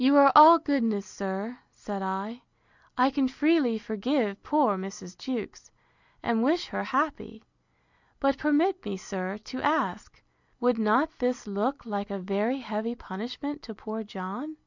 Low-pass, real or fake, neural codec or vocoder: 7.2 kHz; real; none